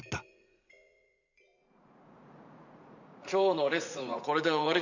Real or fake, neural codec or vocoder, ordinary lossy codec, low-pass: fake; vocoder, 44.1 kHz, 80 mel bands, Vocos; none; 7.2 kHz